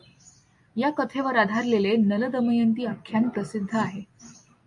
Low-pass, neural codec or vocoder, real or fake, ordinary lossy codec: 10.8 kHz; none; real; MP3, 64 kbps